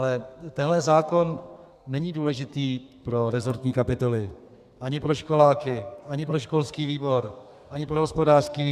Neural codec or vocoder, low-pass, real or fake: codec, 32 kHz, 1.9 kbps, SNAC; 14.4 kHz; fake